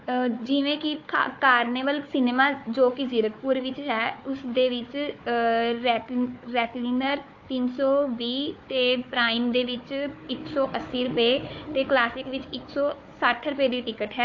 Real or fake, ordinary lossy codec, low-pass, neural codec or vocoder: fake; AAC, 48 kbps; 7.2 kHz; codec, 16 kHz, 4 kbps, FunCodec, trained on Chinese and English, 50 frames a second